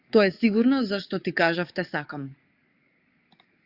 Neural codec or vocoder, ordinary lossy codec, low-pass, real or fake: codec, 44.1 kHz, 7.8 kbps, DAC; Opus, 64 kbps; 5.4 kHz; fake